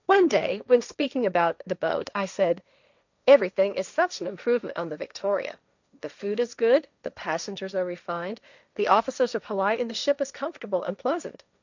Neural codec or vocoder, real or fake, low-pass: codec, 16 kHz, 1.1 kbps, Voila-Tokenizer; fake; 7.2 kHz